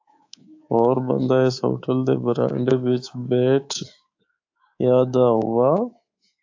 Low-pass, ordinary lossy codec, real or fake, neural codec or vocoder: 7.2 kHz; AAC, 48 kbps; fake; codec, 24 kHz, 3.1 kbps, DualCodec